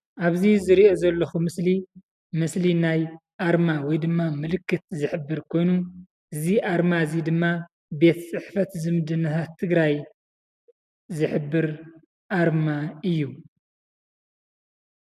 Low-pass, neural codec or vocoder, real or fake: 14.4 kHz; none; real